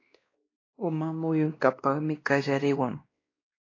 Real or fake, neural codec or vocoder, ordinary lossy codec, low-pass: fake; codec, 16 kHz, 1 kbps, X-Codec, WavLM features, trained on Multilingual LibriSpeech; AAC, 32 kbps; 7.2 kHz